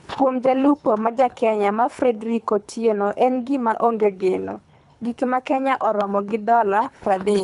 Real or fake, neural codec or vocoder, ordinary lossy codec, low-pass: fake; codec, 24 kHz, 3 kbps, HILCodec; none; 10.8 kHz